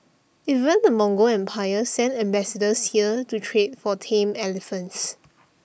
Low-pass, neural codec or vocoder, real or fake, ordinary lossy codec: none; none; real; none